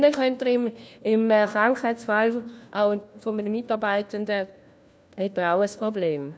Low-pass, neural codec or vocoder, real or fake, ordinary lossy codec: none; codec, 16 kHz, 1 kbps, FunCodec, trained on LibriTTS, 50 frames a second; fake; none